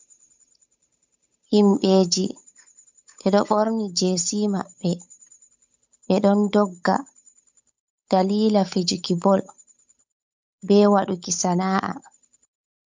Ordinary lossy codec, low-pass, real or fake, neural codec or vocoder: MP3, 64 kbps; 7.2 kHz; fake; codec, 16 kHz, 8 kbps, FunCodec, trained on Chinese and English, 25 frames a second